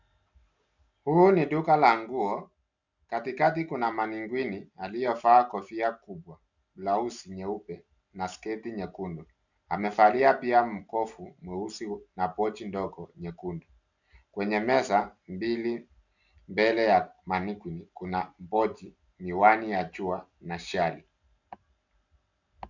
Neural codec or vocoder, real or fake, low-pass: none; real; 7.2 kHz